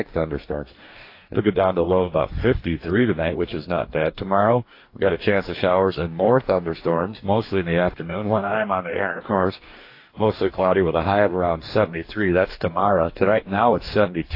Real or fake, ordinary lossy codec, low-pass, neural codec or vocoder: fake; AAC, 32 kbps; 5.4 kHz; codec, 44.1 kHz, 2.6 kbps, DAC